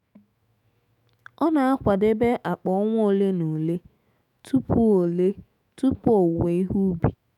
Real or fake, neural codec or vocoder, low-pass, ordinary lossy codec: fake; autoencoder, 48 kHz, 128 numbers a frame, DAC-VAE, trained on Japanese speech; 19.8 kHz; none